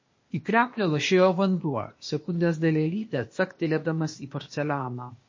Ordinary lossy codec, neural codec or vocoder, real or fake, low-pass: MP3, 32 kbps; codec, 16 kHz, 0.8 kbps, ZipCodec; fake; 7.2 kHz